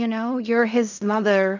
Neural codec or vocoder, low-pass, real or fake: codec, 16 kHz in and 24 kHz out, 0.4 kbps, LongCat-Audio-Codec, fine tuned four codebook decoder; 7.2 kHz; fake